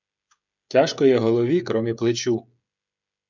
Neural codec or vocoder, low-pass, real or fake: codec, 16 kHz, 16 kbps, FreqCodec, smaller model; 7.2 kHz; fake